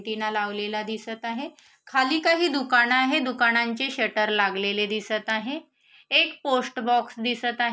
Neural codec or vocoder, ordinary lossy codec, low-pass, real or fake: none; none; none; real